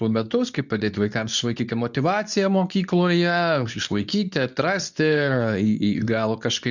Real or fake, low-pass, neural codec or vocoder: fake; 7.2 kHz; codec, 24 kHz, 0.9 kbps, WavTokenizer, medium speech release version 2